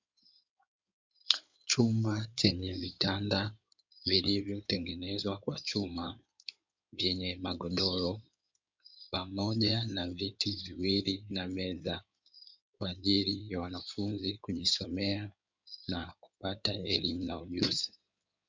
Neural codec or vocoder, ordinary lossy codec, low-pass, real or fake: codec, 16 kHz in and 24 kHz out, 2.2 kbps, FireRedTTS-2 codec; MP3, 64 kbps; 7.2 kHz; fake